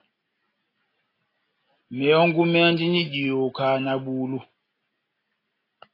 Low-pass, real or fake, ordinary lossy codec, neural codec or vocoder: 5.4 kHz; real; AAC, 24 kbps; none